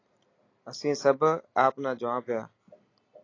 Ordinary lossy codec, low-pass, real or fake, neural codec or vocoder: AAC, 32 kbps; 7.2 kHz; real; none